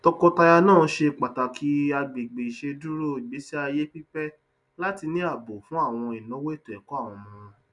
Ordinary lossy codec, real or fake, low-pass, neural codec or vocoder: none; real; 10.8 kHz; none